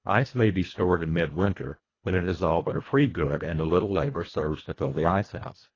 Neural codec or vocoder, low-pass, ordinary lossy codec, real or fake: codec, 24 kHz, 1.5 kbps, HILCodec; 7.2 kHz; AAC, 32 kbps; fake